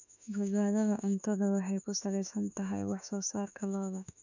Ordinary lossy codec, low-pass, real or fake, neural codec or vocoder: none; 7.2 kHz; fake; autoencoder, 48 kHz, 32 numbers a frame, DAC-VAE, trained on Japanese speech